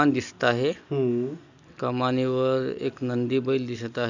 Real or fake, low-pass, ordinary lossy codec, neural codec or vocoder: real; 7.2 kHz; none; none